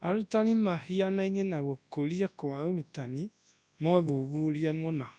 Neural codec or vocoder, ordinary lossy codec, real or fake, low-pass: codec, 24 kHz, 0.9 kbps, WavTokenizer, large speech release; none; fake; 9.9 kHz